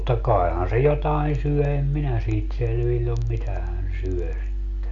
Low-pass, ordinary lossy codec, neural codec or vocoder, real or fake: 7.2 kHz; none; none; real